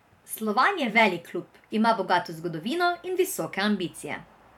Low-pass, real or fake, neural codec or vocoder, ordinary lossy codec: 19.8 kHz; fake; vocoder, 44.1 kHz, 128 mel bands every 512 samples, BigVGAN v2; none